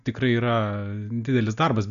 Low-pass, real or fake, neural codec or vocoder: 7.2 kHz; real; none